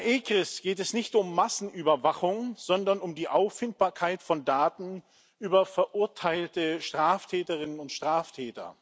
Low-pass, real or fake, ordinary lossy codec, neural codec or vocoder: none; real; none; none